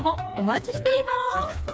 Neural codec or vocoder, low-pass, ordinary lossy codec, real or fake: codec, 16 kHz, 2 kbps, FreqCodec, smaller model; none; none; fake